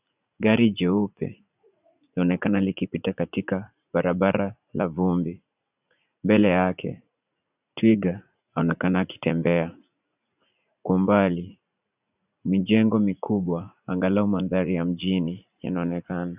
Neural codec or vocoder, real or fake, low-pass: vocoder, 44.1 kHz, 80 mel bands, Vocos; fake; 3.6 kHz